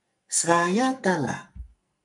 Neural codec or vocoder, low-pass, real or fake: codec, 44.1 kHz, 2.6 kbps, SNAC; 10.8 kHz; fake